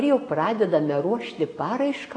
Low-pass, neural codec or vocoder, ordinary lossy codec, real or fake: 9.9 kHz; none; AAC, 32 kbps; real